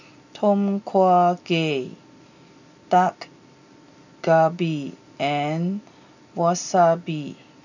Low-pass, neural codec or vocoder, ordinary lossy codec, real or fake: 7.2 kHz; none; none; real